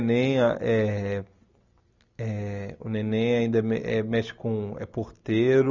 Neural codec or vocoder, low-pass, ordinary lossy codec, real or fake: none; 7.2 kHz; none; real